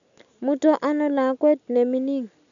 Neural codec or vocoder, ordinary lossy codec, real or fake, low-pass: none; none; real; 7.2 kHz